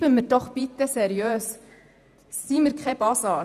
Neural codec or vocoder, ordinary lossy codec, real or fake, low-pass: vocoder, 48 kHz, 128 mel bands, Vocos; none; fake; 14.4 kHz